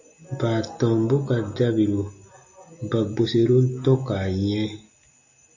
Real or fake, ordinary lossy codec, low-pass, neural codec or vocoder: real; AAC, 48 kbps; 7.2 kHz; none